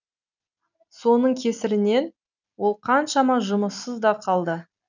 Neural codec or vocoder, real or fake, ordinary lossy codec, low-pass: none; real; none; 7.2 kHz